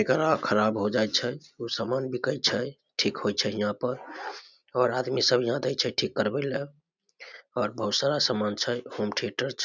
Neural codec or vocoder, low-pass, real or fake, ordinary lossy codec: none; 7.2 kHz; real; none